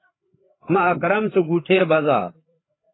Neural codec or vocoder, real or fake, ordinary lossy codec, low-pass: codec, 16 kHz, 4 kbps, FreqCodec, larger model; fake; AAC, 16 kbps; 7.2 kHz